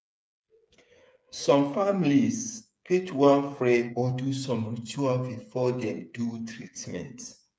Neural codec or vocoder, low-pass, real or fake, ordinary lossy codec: codec, 16 kHz, 8 kbps, FreqCodec, smaller model; none; fake; none